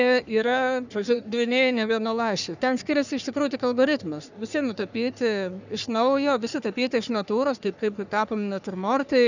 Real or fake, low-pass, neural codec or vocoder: fake; 7.2 kHz; codec, 44.1 kHz, 3.4 kbps, Pupu-Codec